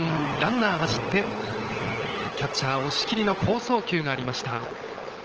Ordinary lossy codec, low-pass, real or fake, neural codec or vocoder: Opus, 16 kbps; 7.2 kHz; fake; codec, 16 kHz, 16 kbps, FunCodec, trained on LibriTTS, 50 frames a second